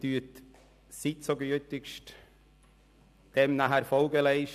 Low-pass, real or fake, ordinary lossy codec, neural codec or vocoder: 14.4 kHz; real; AAC, 96 kbps; none